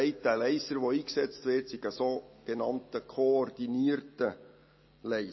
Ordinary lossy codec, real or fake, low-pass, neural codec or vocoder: MP3, 24 kbps; real; 7.2 kHz; none